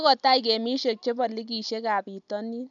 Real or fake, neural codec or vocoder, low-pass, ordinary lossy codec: real; none; 7.2 kHz; none